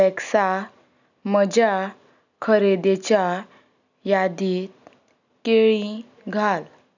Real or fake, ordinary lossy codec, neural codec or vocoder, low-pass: real; none; none; 7.2 kHz